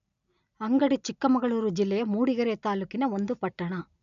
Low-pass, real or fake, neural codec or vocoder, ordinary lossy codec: 7.2 kHz; real; none; none